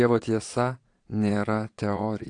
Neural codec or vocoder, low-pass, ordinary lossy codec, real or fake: vocoder, 22.05 kHz, 80 mel bands, Vocos; 9.9 kHz; AAC, 48 kbps; fake